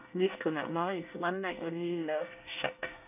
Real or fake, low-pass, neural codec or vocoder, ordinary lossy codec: fake; 3.6 kHz; codec, 24 kHz, 1 kbps, SNAC; none